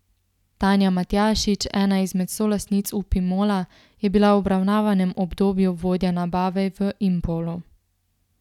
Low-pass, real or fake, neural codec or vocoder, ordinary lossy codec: 19.8 kHz; real; none; none